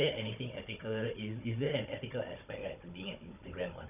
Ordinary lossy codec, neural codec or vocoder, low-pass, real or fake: none; codec, 16 kHz, 16 kbps, FunCodec, trained on Chinese and English, 50 frames a second; 3.6 kHz; fake